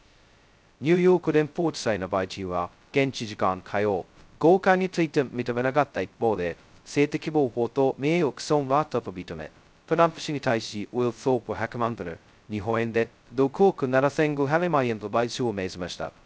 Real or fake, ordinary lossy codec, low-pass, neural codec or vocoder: fake; none; none; codec, 16 kHz, 0.2 kbps, FocalCodec